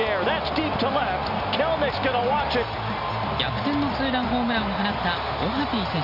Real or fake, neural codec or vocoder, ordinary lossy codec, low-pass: real; none; none; 5.4 kHz